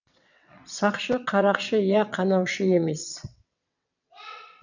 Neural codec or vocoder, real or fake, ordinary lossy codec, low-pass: vocoder, 22.05 kHz, 80 mel bands, WaveNeXt; fake; none; 7.2 kHz